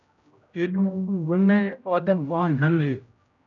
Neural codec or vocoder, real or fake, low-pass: codec, 16 kHz, 0.5 kbps, X-Codec, HuBERT features, trained on general audio; fake; 7.2 kHz